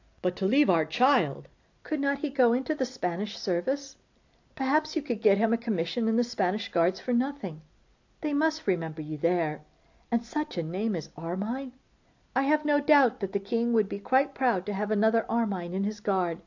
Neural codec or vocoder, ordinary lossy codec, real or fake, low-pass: none; AAC, 48 kbps; real; 7.2 kHz